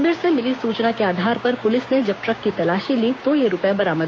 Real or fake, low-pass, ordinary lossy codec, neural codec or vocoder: fake; none; none; codec, 16 kHz, 6 kbps, DAC